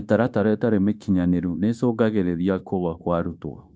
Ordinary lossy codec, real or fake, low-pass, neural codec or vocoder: none; fake; none; codec, 16 kHz, 0.9 kbps, LongCat-Audio-Codec